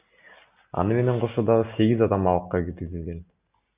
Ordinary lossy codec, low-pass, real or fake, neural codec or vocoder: Opus, 64 kbps; 3.6 kHz; real; none